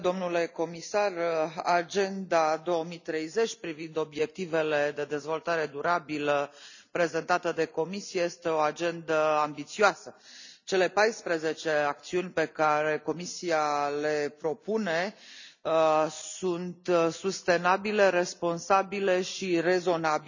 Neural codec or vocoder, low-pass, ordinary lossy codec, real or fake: none; 7.2 kHz; none; real